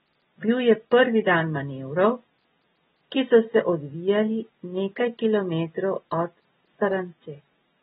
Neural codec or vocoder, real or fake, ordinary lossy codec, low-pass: none; real; AAC, 16 kbps; 7.2 kHz